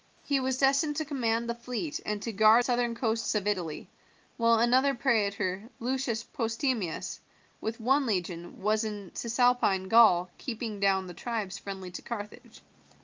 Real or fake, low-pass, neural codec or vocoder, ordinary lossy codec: real; 7.2 kHz; none; Opus, 24 kbps